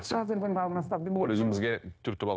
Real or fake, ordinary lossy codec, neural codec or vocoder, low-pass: fake; none; codec, 16 kHz, 0.9 kbps, LongCat-Audio-Codec; none